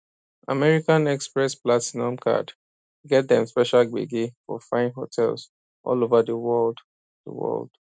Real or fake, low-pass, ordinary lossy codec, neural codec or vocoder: real; none; none; none